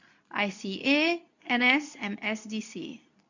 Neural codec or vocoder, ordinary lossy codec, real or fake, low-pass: codec, 24 kHz, 0.9 kbps, WavTokenizer, medium speech release version 2; none; fake; 7.2 kHz